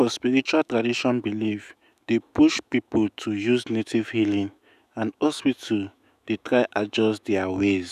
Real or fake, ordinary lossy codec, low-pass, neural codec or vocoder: fake; none; 14.4 kHz; autoencoder, 48 kHz, 128 numbers a frame, DAC-VAE, trained on Japanese speech